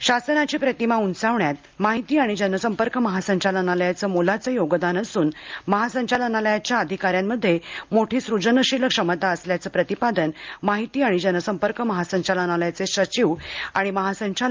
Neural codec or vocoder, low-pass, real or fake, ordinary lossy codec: none; 7.2 kHz; real; Opus, 24 kbps